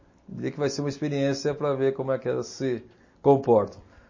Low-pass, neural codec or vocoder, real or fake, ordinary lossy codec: 7.2 kHz; none; real; MP3, 32 kbps